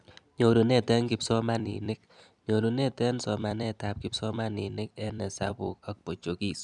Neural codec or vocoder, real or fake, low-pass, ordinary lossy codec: vocoder, 44.1 kHz, 128 mel bands every 256 samples, BigVGAN v2; fake; 10.8 kHz; none